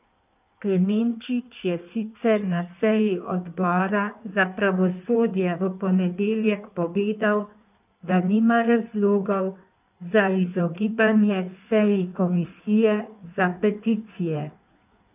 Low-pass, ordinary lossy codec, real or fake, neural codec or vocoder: 3.6 kHz; none; fake; codec, 16 kHz in and 24 kHz out, 1.1 kbps, FireRedTTS-2 codec